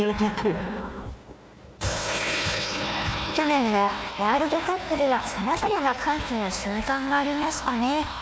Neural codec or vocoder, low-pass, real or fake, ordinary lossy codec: codec, 16 kHz, 1 kbps, FunCodec, trained on Chinese and English, 50 frames a second; none; fake; none